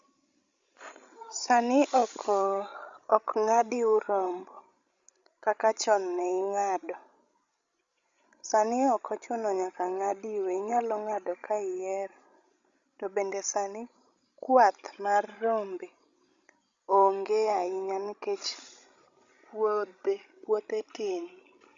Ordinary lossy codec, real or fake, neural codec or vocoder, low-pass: Opus, 64 kbps; fake; codec, 16 kHz, 16 kbps, FreqCodec, larger model; 7.2 kHz